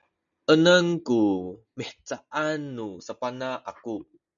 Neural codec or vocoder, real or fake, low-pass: none; real; 7.2 kHz